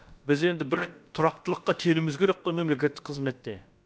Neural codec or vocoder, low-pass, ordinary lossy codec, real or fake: codec, 16 kHz, about 1 kbps, DyCAST, with the encoder's durations; none; none; fake